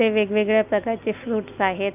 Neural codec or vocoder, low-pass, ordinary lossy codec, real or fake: none; 3.6 kHz; none; real